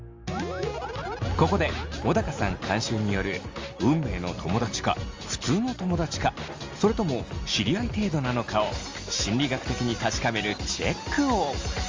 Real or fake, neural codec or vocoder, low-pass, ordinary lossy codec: real; none; 7.2 kHz; Opus, 32 kbps